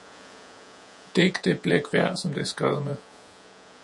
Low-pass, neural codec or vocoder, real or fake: 10.8 kHz; vocoder, 48 kHz, 128 mel bands, Vocos; fake